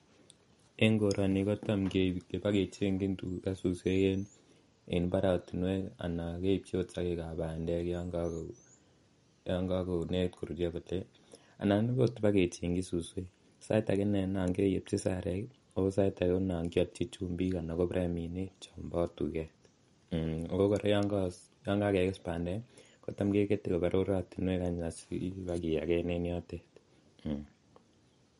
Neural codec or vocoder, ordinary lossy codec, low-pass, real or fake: none; MP3, 48 kbps; 14.4 kHz; real